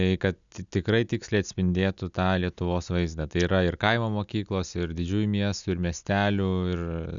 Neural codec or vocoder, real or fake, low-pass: none; real; 7.2 kHz